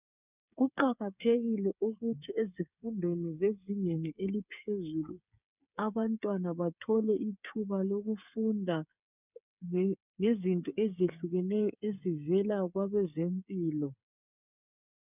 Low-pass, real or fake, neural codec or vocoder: 3.6 kHz; fake; codec, 16 kHz, 8 kbps, FreqCodec, smaller model